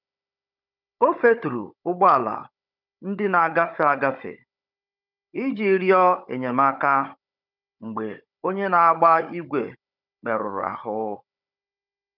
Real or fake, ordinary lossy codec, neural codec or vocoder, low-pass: fake; none; codec, 16 kHz, 16 kbps, FunCodec, trained on Chinese and English, 50 frames a second; 5.4 kHz